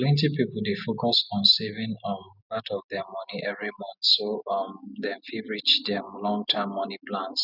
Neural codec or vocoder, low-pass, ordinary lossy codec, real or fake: none; 5.4 kHz; none; real